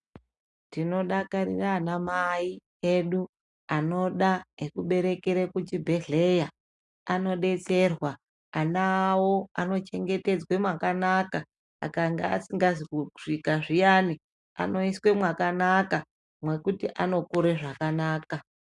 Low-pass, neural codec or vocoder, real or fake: 10.8 kHz; none; real